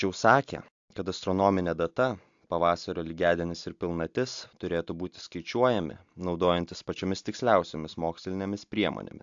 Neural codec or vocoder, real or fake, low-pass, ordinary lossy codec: none; real; 7.2 kHz; AAC, 64 kbps